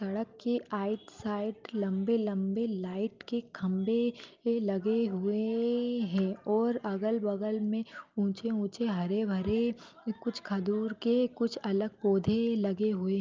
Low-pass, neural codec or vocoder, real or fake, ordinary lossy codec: 7.2 kHz; none; real; Opus, 24 kbps